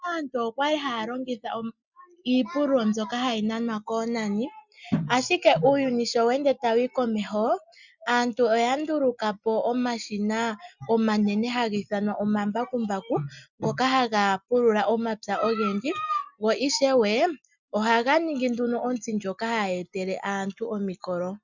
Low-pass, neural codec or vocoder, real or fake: 7.2 kHz; none; real